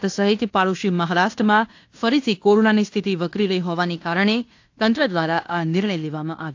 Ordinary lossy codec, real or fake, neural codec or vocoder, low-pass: AAC, 48 kbps; fake; codec, 16 kHz in and 24 kHz out, 0.9 kbps, LongCat-Audio-Codec, fine tuned four codebook decoder; 7.2 kHz